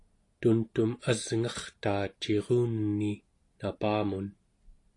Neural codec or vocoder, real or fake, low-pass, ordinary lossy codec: none; real; 10.8 kHz; AAC, 48 kbps